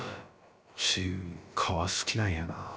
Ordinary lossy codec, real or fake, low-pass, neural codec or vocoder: none; fake; none; codec, 16 kHz, about 1 kbps, DyCAST, with the encoder's durations